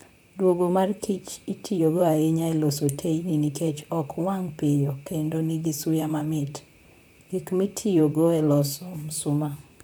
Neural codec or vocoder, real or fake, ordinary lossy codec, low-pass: vocoder, 44.1 kHz, 128 mel bands, Pupu-Vocoder; fake; none; none